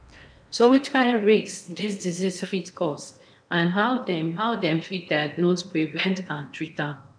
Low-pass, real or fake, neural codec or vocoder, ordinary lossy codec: 9.9 kHz; fake; codec, 16 kHz in and 24 kHz out, 0.8 kbps, FocalCodec, streaming, 65536 codes; none